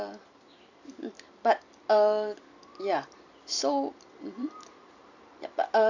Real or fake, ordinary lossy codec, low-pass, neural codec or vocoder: fake; none; 7.2 kHz; vocoder, 44.1 kHz, 128 mel bands every 256 samples, BigVGAN v2